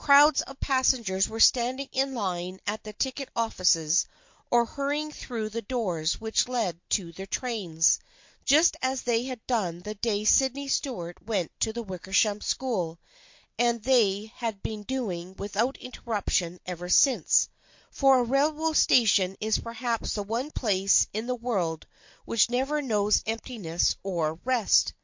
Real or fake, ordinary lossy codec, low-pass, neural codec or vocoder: real; MP3, 48 kbps; 7.2 kHz; none